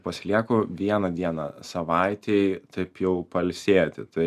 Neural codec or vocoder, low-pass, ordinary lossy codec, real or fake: none; 14.4 kHz; MP3, 96 kbps; real